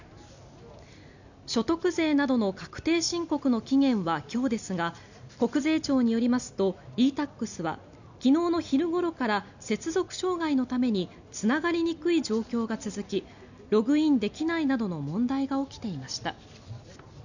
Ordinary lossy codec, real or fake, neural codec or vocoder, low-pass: none; real; none; 7.2 kHz